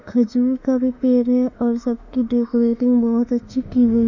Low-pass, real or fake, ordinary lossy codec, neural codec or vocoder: 7.2 kHz; fake; none; autoencoder, 48 kHz, 32 numbers a frame, DAC-VAE, trained on Japanese speech